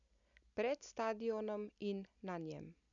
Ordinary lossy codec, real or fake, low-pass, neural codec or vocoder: none; real; 7.2 kHz; none